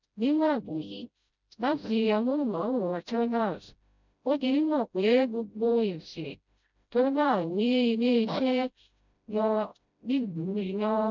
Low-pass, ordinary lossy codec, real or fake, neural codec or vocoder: 7.2 kHz; none; fake; codec, 16 kHz, 0.5 kbps, FreqCodec, smaller model